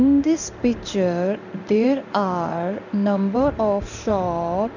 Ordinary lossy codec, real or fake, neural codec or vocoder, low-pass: none; fake; codec, 16 kHz in and 24 kHz out, 1 kbps, XY-Tokenizer; 7.2 kHz